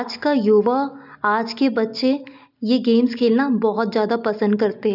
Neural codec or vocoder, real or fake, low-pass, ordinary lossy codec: none; real; 5.4 kHz; none